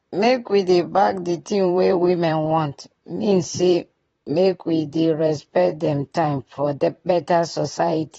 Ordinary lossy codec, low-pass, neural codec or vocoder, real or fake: AAC, 24 kbps; 19.8 kHz; vocoder, 44.1 kHz, 128 mel bands, Pupu-Vocoder; fake